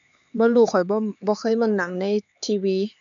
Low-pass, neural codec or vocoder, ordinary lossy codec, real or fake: 7.2 kHz; codec, 16 kHz, 4 kbps, X-Codec, HuBERT features, trained on LibriSpeech; none; fake